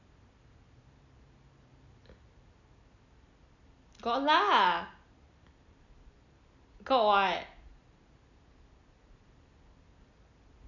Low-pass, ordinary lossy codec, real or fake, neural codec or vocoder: 7.2 kHz; none; real; none